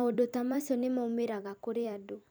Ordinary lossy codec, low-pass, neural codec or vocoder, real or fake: none; none; vocoder, 44.1 kHz, 128 mel bands every 256 samples, BigVGAN v2; fake